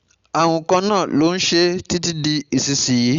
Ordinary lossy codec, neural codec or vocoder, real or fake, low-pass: Opus, 64 kbps; none; real; 7.2 kHz